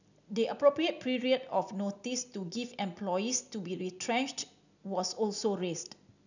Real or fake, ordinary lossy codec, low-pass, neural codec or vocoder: real; none; 7.2 kHz; none